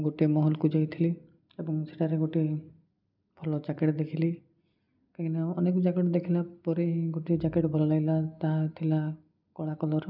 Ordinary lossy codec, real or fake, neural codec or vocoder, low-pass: none; real; none; 5.4 kHz